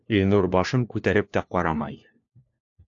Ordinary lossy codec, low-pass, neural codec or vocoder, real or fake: AAC, 48 kbps; 7.2 kHz; codec, 16 kHz, 1 kbps, FunCodec, trained on LibriTTS, 50 frames a second; fake